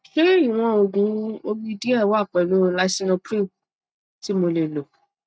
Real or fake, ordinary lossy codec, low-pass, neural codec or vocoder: real; none; none; none